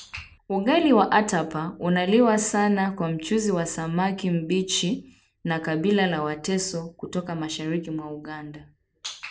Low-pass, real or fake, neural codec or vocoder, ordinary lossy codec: none; real; none; none